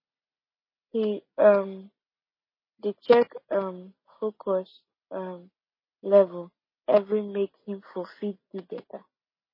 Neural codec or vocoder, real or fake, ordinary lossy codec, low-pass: none; real; MP3, 24 kbps; 5.4 kHz